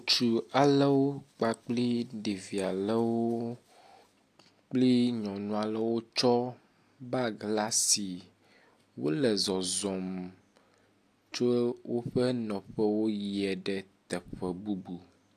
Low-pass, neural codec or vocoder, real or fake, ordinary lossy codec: 14.4 kHz; none; real; AAC, 64 kbps